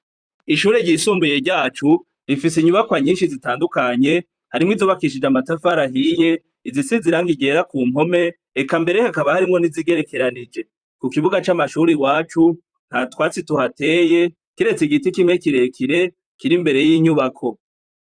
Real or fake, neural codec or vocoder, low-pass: fake; vocoder, 44.1 kHz, 128 mel bands, Pupu-Vocoder; 9.9 kHz